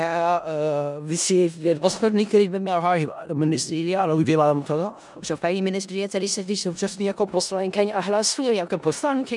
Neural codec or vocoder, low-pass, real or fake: codec, 16 kHz in and 24 kHz out, 0.4 kbps, LongCat-Audio-Codec, four codebook decoder; 10.8 kHz; fake